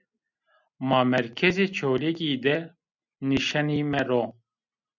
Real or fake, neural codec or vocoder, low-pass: real; none; 7.2 kHz